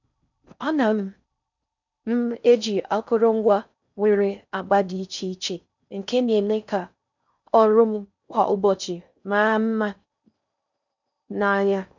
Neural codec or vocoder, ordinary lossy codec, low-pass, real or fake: codec, 16 kHz in and 24 kHz out, 0.6 kbps, FocalCodec, streaming, 4096 codes; none; 7.2 kHz; fake